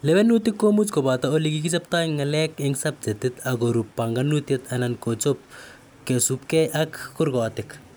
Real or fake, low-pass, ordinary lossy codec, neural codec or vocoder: real; none; none; none